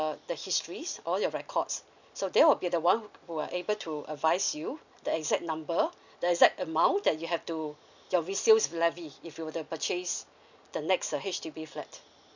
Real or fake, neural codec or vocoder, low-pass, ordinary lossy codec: real; none; 7.2 kHz; none